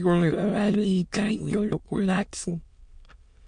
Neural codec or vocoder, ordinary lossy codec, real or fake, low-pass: autoencoder, 22.05 kHz, a latent of 192 numbers a frame, VITS, trained on many speakers; MP3, 48 kbps; fake; 9.9 kHz